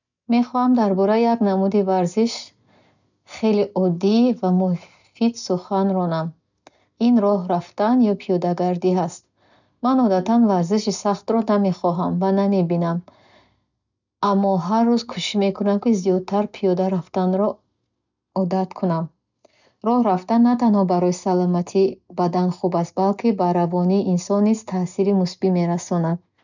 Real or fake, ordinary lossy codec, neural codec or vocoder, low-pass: real; none; none; 7.2 kHz